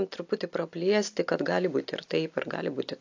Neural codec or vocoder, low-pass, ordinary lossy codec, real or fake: none; 7.2 kHz; AAC, 48 kbps; real